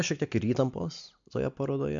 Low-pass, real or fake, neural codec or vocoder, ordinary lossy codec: 7.2 kHz; real; none; MP3, 64 kbps